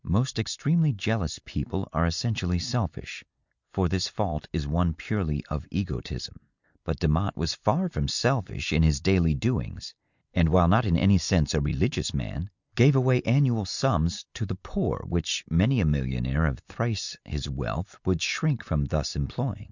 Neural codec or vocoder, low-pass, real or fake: none; 7.2 kHz; real